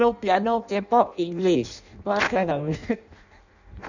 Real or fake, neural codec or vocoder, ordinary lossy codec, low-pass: fake; codec, 16 kHz in and 24 kHz out, 0.6 kbps, FireRedTTS-2 codec; none; 7.2 kHz